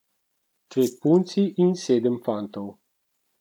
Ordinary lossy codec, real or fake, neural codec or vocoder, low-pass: none; real; none; 19.8 kHz